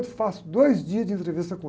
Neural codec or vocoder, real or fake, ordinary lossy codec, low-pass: none; real; none; none